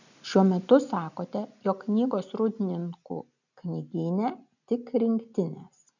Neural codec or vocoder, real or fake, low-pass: none; real; 7.2 kHz